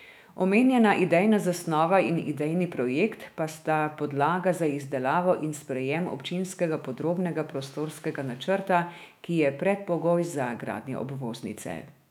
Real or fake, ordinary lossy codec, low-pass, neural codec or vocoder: fake; none; 19.8 kHz; autoencoder, 48 kHz, 128 numbers a frame, DAC-VAE, trained on Japanese speech